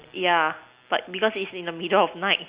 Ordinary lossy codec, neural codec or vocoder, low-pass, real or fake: Opus, 64 kbps; none; 3.6 kHz; real